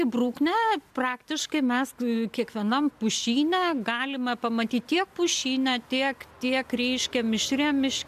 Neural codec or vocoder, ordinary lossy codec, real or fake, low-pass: none; MP3, 96 kbps; real; 14.4 kHz